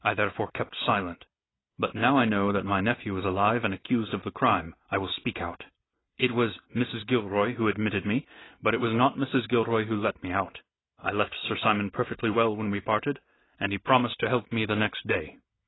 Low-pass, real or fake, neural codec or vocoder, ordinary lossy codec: 7.2 kHz; real; none; AAC, 16 kbps